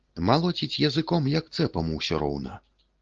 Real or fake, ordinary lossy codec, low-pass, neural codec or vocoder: real; Opus, 16 kbps; 7.2 kHz; none